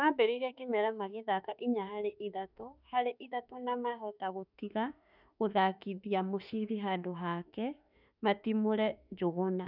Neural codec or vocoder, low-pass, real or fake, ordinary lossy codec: autoencoder, 48 kHz, 32 numbers a frame, DAC-VAE, trained on Japanese speech; 5.4 kHz; fake; none